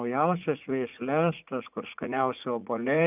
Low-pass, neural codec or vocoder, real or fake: 3.6 kHz; vocoder, 44.1 kHz, 80 mel bands, Vocos; fake